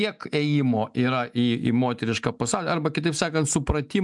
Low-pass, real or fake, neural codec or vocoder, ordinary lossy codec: 10.8 kHz; real; none; MP3, 96 kbps